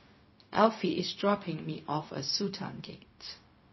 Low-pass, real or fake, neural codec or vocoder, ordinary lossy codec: 7.2 kHz; fake; codec, 16 kHz, 0.4 kbps, LongCat-Audio-Codec; MP3, 24 kbps